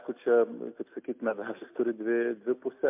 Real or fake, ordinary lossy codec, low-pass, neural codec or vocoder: real; MP3, 32 kbps; 3.6 kHz; none